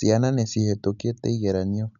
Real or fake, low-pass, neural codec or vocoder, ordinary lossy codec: real; 7.2 kHz; none; none